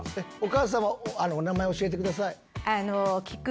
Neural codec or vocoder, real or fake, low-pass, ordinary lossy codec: none; real; none; none